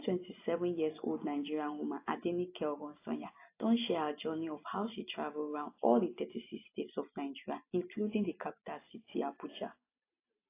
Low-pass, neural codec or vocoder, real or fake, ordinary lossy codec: 3.6 kHz; none; real; AAC, 24 kbps